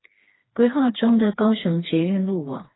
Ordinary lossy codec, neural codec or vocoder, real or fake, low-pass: AAC, 16 kbps; codec, 16 kHz, 2 kbps, FreqCodec, smaller model; fake; 7.2 kHz